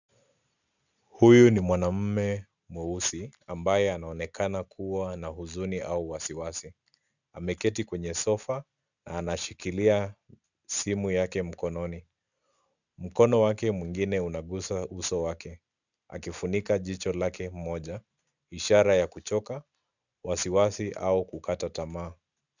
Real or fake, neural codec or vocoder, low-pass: real; none; 7.2 kHz